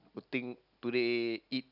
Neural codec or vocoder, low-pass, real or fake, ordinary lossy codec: none; 5.4 kHz; real; none